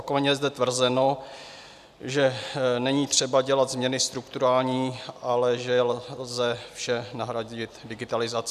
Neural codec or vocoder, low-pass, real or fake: none; 14.4 kHz; real